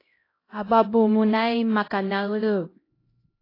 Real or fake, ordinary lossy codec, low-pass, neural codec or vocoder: fake; AAC, 24 kbps; 5.4 kHz; codec, 16 kHz, 0.5 kbps, X-Codec, HuBERT features, trained on LibriSpeech